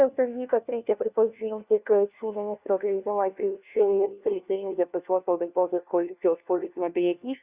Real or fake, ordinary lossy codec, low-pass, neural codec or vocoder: fake; Opus, 64 kbps; 3.6 kHz; codec, 16 kHz, 1 kbps, FunCodec, trained on LibriTTS, 50 frames a second